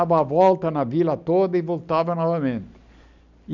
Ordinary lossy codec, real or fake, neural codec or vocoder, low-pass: none; real; none; 7.2 kHz